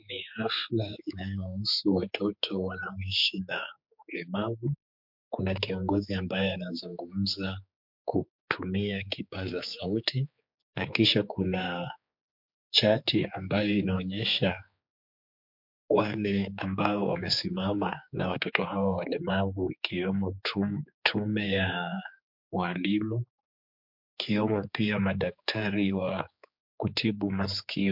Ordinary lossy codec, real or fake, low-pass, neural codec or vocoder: MP3, 48 kbps; fake; 5.4 kHz; codec, 16 kHz, 4 kbps, X-Codec, HuBERT features, trained on general audio